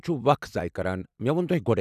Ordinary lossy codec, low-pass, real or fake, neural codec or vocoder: none; 14.4 kHz; real; none